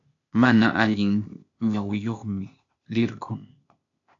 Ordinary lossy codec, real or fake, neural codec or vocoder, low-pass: AAC, 64 kbps; fake; codec, 16 kHz, 0.8 kbps, ZipCodec; 7.2 kHz